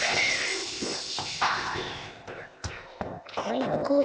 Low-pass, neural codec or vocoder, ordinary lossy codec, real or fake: none; codec, 16 kHz, 0.8 kbps, ZipCodec; none; fake